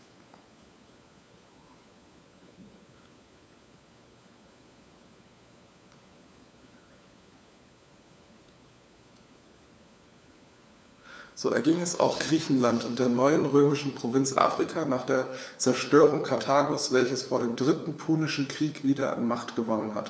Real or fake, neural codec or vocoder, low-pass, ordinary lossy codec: fake; codec, 16 kHz, 4 kbps, FunCodec, trained on LibriTTS, 50 frames a second; none; none